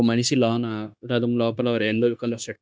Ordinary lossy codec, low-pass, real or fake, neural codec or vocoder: none; none; fake; codec, 16 kHz, 0.9 kbps, LongCat-Audio-Codec